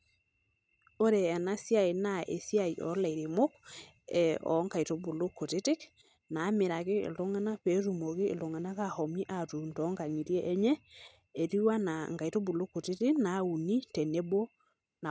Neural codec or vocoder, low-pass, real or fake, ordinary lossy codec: none; none; real; none